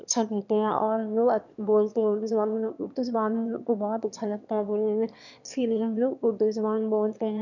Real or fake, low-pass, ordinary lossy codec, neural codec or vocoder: fake; 7.2 kHz; none; autoencoder, 22.05 kHz, a latent of 192 numbers a frame, VITS, trained on one speaker